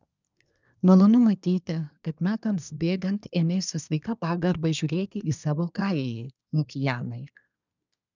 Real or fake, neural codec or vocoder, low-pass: fake; codec, 24 kHz, 1 kbps, SNAC; 7.2 kHz